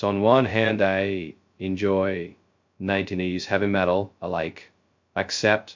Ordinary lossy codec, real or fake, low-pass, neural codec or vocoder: MP3, 48 kbps; fake; 7.2 kHz; codec, 16 kHz, 0.2 kbps, FocalCodec